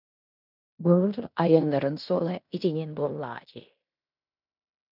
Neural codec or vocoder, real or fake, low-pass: codec, 16 kHz in and 24 kHz out, 0.9 kbps, LongCat-Audio-Codec, fine tuned four codebook decoder; fake; 5.4 kHz